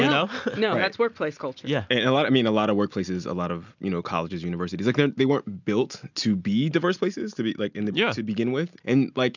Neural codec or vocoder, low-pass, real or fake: none; 7.2 kHz; real